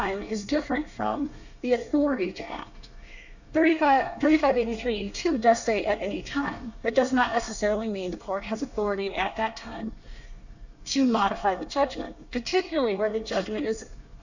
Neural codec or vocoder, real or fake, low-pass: codec, 24 kHz, 1 kbps, SNAC; fake; 7.2 kHz